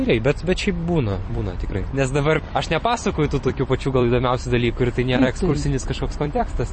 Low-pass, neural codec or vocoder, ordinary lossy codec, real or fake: 10.8 kHz; vocoder, 44.1 kHz, 128 mel bands every 256 samples, BigVGAN v2; MP3, 32 kbps; fake